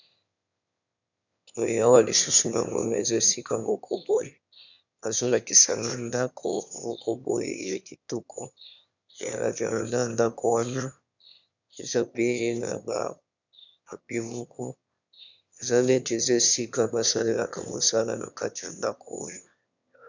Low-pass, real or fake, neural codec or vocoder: 7.2 kHz; fake; autoencoder, 22.05 kHz, a latent of 192 numbers a frame, VITS, trained on one speaker